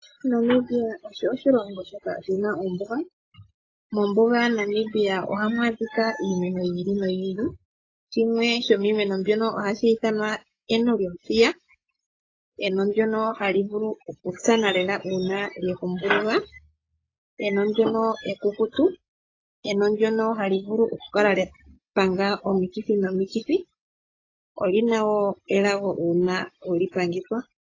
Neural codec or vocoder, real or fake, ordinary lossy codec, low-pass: none; real; AAC, 32 kbps; 7.2 kHz